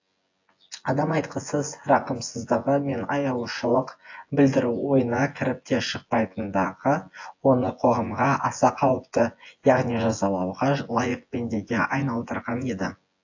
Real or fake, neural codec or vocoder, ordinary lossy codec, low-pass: fake; vocoder, 24 kHz, 100 mel bands, Vocos; AAC, 48 kbps; 7.2 kHz